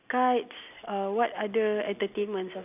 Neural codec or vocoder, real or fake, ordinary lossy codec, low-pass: none; real; none; 3.6 kHz